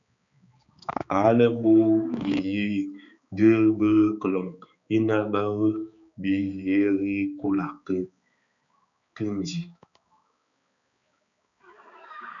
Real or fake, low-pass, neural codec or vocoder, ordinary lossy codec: fake; 7.2 kHz; codec, 16 kHz, 4 kbps, X-Codec, HuBERT features, trained on balanced general audio; MP3, 96 kbps